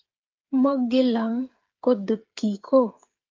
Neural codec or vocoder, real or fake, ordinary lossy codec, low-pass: codec, 16 kHz, 8 kbps, FreqCodec, smaller model; fake; Opus, 32 kbps; 7.2 kHz